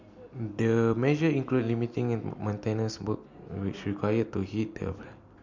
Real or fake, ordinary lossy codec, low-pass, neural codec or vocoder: real; MP3, 64 kbps; 7.2 kHz; none